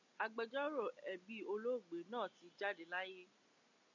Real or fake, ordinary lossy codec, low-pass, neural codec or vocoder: real; MP3, 64 kbps; 7.2 kHz; none